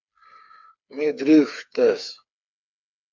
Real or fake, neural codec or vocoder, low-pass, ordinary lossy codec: fake; codec, 16 kHz, 4 kbps, FreqCodec, smaller model; 7.2 kHz; MP3, 64 kbps